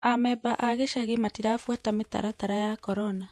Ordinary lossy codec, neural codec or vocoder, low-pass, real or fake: MP3, 64 kbps; vocoder, 48 kHz, 128 mel bands, Vocos; 14.4 kHz; fake